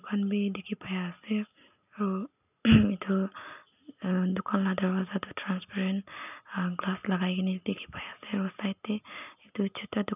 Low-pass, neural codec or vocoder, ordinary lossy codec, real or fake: 3.6 kHz; none; none; real